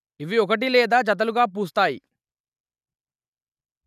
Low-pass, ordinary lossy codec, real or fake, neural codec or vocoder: 14.4 kHz; none; real; none